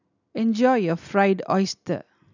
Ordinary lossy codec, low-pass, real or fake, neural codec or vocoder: none; 7.2 kHz; real; none